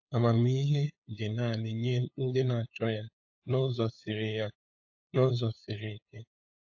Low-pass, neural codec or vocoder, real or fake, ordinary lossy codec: 7.2 kHz; codec, 16 kHz, 8 kbps, FunCodec, trained on LibriTTS, 25 frames a second; fake; AAC, 48 kbps